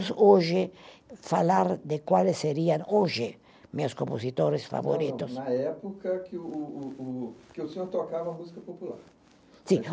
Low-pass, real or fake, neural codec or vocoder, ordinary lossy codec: none; real; none; none